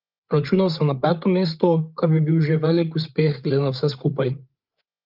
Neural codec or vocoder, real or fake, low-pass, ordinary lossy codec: codec, 16 kHz, 8 kbps, FreqCodec, larger model; fake; 5.4 kHz; Opus, 24 kbps